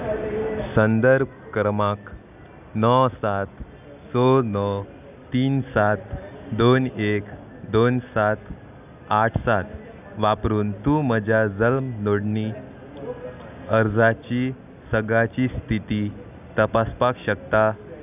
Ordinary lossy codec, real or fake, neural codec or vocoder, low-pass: none; real; none; 3.6 kHz